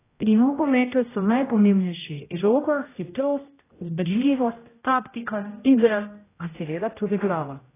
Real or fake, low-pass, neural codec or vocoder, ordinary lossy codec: fake; 3.6 kHz; codec, 16 kHz, 0.5 kbps, X-Codec, HuBERT features, trained on general audio; AAC, 16 kbps